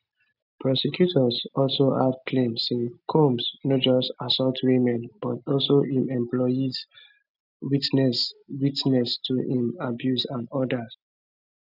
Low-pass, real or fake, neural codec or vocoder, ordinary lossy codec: 5.4 kHz; real; none; none